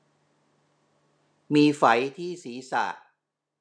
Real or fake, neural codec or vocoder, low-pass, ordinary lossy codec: real; none; 9.9 kHz; none